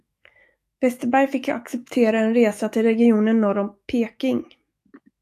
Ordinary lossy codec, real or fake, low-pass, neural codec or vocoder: AAC, 64 kbps; fake; 14.4 kHz; autoencoder, 48 kHz, 128 numbers a frame, DAC-VAE, trained on Japanese speech